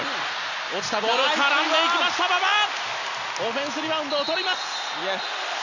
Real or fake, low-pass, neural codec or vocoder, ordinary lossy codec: real; 7.2 kHz; none; none